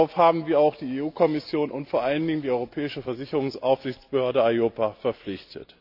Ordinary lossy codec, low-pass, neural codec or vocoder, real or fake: AAC, 48 kbps; 5.4 kHz; none; real